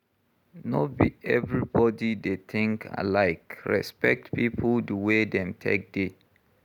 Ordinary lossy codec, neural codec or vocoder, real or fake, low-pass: none; none; real; 19.8 kHz